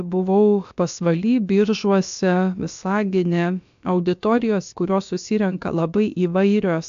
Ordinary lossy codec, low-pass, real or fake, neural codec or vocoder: AAC, 64 kbps; 7.2 kHz; fake; codec, 16 kHz, about 1 kbps, DyCAST, with the encoder's durations